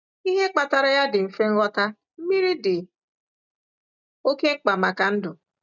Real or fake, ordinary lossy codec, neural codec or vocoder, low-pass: real; none; none; 7.2 kHz